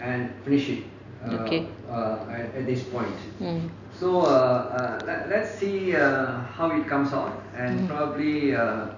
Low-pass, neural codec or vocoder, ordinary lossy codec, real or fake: 7.2 kHz; none; none; real